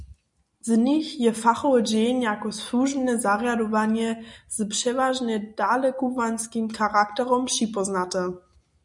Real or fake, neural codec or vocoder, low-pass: real; none; 10.8 kHz